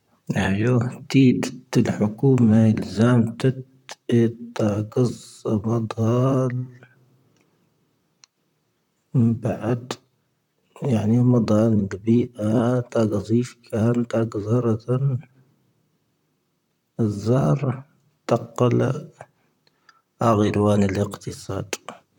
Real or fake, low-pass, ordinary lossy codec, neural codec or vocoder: fake; 19.8 kHz; none; vocoder, 44.1 kHz, 128 mel bands, Pupu-Vocoder